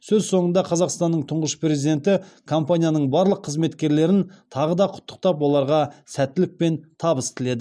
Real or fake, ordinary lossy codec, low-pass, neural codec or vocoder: real; none; none; none